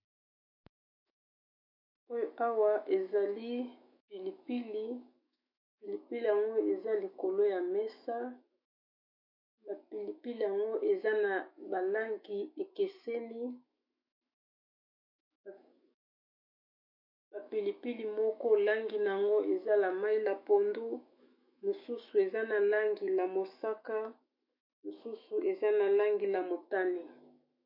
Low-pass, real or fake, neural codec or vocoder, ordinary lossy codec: 5.4 kHz; fake; autoencoder, 48 kHz, 128 numbers a frame, DAC-VAE, trained on Japanese speech; MP3, 32 kbps